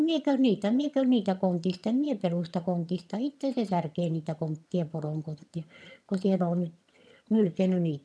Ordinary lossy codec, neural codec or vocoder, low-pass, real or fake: none; vocoder, 22.05 kHz, 80 mel bands, HiFi-GAN; none; fake